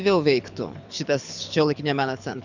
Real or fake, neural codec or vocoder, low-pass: fake; codec, 24 kHz, 6 kbps, HILCodec; 7.2 kHz